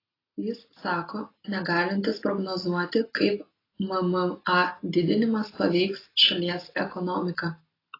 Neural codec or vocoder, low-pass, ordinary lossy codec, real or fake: none; 5.4 kHz; AAC, 24 kbps; real